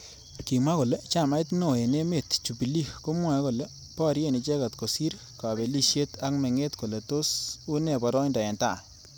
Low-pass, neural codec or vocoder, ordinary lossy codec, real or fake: none; none; none; real